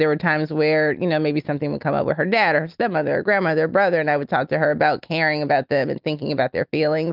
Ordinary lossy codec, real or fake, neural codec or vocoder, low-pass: Opus, 24 kbps; real; none; 5.4 kHz